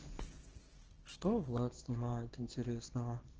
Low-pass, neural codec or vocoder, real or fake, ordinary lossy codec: 7.2 kHz; codec, 24 kHz, 0.9 kbps, WavTokenizer, small release; fake; Opus, 16 kbps